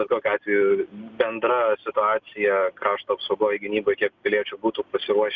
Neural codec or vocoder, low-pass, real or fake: none; 7.2 kHz; real